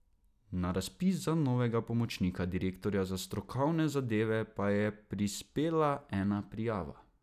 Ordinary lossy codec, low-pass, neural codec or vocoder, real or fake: none; 14.4 kHz; none; real